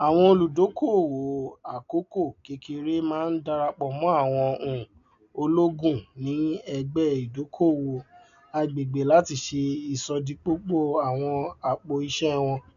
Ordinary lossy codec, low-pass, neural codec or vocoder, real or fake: none; 7.2 kHz; none; real